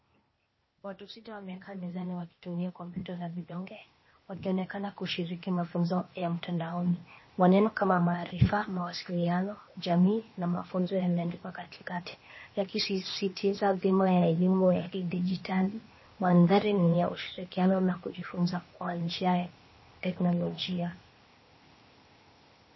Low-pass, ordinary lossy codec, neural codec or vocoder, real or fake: 7.2 kHz; MP3, 24 kbps; codec, 16 kHz, 0.8 kbps, ZipCodec; fake